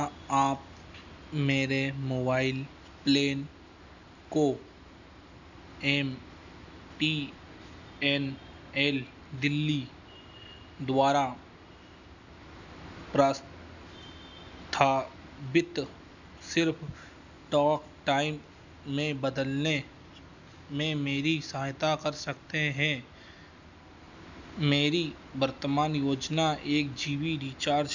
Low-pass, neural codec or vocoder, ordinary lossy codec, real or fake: 7.2 kHz; none; none; real